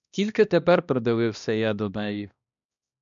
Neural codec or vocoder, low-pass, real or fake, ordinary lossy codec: codec, 16 kHz, 1 kbps, X-Codec, HuBERT features, trained on balanced general audio; 7.2 kHz; fake; MP3, 96 kbps